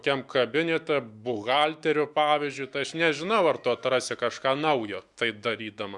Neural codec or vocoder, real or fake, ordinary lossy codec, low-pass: autoencoder, 48 kHz, 128 numbers a frame, DAC-VAE, trained on Japanese speech; fake; Opus, 64 kbps; 10.8 kHz